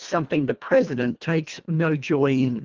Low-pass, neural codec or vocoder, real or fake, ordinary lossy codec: 7.2 kHz; codec, 24 kHz, 1.5 kbps, HILCodec; fake; Opus, 24 kbps